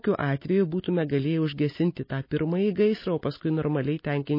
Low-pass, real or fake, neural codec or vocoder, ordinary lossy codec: 5.4 kHz; real; none; MP3, 24 kbps